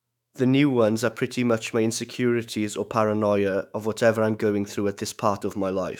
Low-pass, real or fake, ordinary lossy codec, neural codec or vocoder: 19.8 kHz; fake; none; autoencoder, 48 kHz, 128 numbers a frame, DAC-VAE, trained on Japanese speech